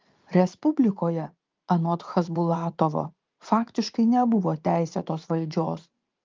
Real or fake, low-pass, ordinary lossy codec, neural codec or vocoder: fake; 7.2 kHz; Opus, 24 kbps; vocoder, 44.1 kHz, 80 mel bands, Vocos